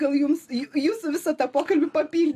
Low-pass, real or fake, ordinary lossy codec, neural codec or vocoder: 14.4 kHz; real; AAC, 64 kbps; none